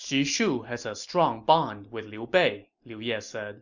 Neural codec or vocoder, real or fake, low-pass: none; real; 7.2 kHz